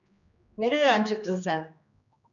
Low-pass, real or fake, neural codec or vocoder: 7.2 kHz; fake; codec, 16 kHz, 2 kbps, X-Codec, HuBERT features, trained on general audio